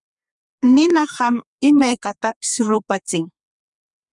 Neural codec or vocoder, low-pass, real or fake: codec, 32 kHz, 1.9 kbps, SNAC; 10.8 kHz; fake